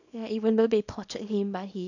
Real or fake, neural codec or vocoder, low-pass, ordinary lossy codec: fake; codec, 24 kHz, 0.9 kbps, WavTokenizer, small release; 7.2 kHz; none